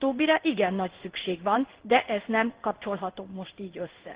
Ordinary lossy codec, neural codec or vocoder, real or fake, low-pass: Opus, 16 kbps; codec, 16 kHz in and 24 kHz out, 1 kbps, XY-Tokenizer; fake; 3.6 kHz